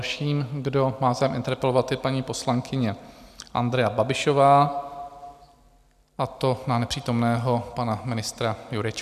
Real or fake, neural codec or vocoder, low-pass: real; none; 14.4 kHz